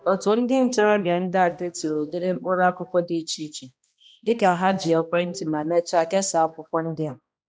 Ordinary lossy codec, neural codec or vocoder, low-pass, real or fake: none; codec, 16 kHz, 1 kbps, X-Codec, HuBERT features, trained on balanced general audio; none; fake